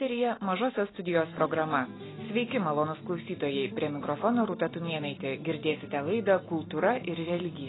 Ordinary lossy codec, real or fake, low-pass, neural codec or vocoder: AAC, 16 kbps; real; 7.2 kHz; none